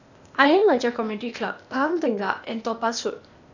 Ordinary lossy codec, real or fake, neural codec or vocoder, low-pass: none; fake; codec, 16 kHz, 0.8 kbps, ZipCodec; 7.2 kHz